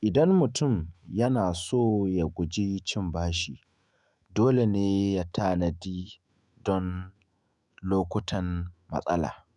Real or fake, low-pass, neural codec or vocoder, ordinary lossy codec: fake; 10.8 kHz; autoencoder, 48 kHz, 128 numbers a frame, DAC-VAE, trained on Japanese speech; none